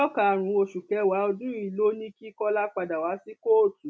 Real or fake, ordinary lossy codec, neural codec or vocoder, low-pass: real; none; none; none